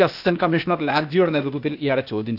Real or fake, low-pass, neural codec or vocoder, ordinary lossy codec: fake; 5.4 kHz; codec, 16 kHz, 0.8 kbps, ZipCodec; none